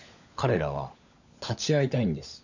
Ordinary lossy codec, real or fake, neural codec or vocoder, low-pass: none; fake; codec, 16 kHz, 16 kbps, FunCodec, trained on LibriTTS, 50 frames a second; 7.2 kHz